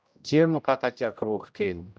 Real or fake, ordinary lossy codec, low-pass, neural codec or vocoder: fake; none; none; codec, 16 kHz, 0.5 kbps, X-Codec, HuBERT features, trained on general audio